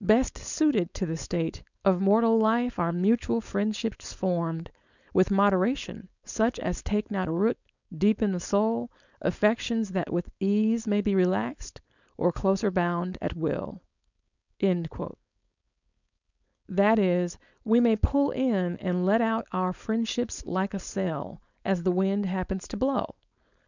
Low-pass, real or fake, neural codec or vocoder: 7.2 kHz; fake; codec, 16 kHz, 4.8 kbps, FACodec